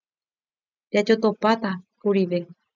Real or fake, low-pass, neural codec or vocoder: real; 7.2 kHz; none